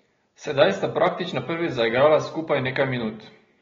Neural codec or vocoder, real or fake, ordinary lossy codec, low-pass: none; real; AAC, 24 kbps; 7.2 kHz